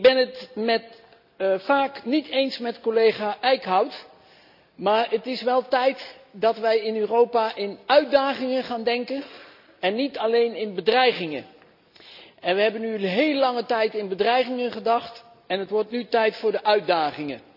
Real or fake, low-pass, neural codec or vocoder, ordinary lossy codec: real; 5.4 kHz; none; none